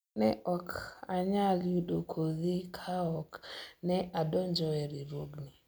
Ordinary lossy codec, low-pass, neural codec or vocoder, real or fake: none; none; none; real